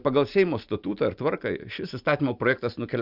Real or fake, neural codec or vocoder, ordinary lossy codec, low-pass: real; none; Opus, 64 kbps; 5.4 kHz